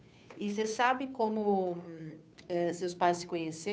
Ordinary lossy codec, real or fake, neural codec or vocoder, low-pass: none; fake; codec, 16 kHz, 2 kbps, FunCodec, trained on Chinese and English, 25 frames a second; none